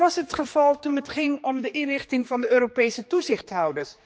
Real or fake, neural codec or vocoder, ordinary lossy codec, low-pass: fake; codec, 16 kHz, 2 kbps, X-Codec, HuBERT features, trained on general audio; none; none